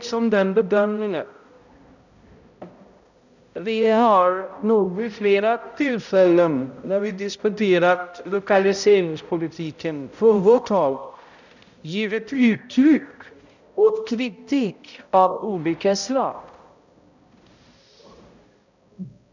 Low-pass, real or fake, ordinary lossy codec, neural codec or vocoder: 7.2 kHz; fake; none; codec, 16 kHz, 0.5 kbps, X-Codec, HuBERT features, trained on balanced general audio